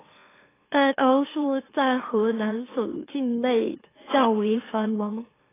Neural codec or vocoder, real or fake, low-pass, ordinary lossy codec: autoencoder, 44.1 kHz, a latent of 192 numbers a frame, MeloTTS; fake; 3.6 kHz; AAC, 16 kbps